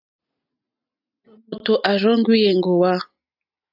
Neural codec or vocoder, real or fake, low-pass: none; real; 5.4 kHz